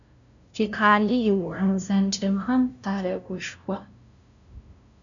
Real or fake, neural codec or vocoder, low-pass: fake; codec, 16 kHz, 0.5 kbps, FunCodec, trained on LibriTTS, 25 frames a second; 7.2 kHz